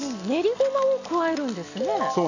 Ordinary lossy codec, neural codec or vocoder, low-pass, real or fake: none; none; 7.2 kHz; real